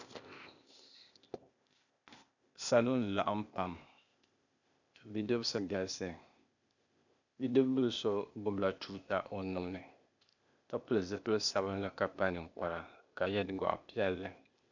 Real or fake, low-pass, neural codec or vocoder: fake; 7.2 kHz; codec, 16 kHz, 0.8 kbps, ZipCodec